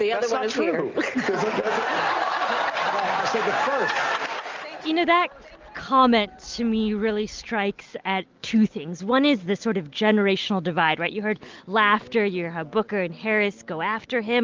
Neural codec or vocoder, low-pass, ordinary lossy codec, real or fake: none; 7.2 kHz; Opus, 32 kbps; real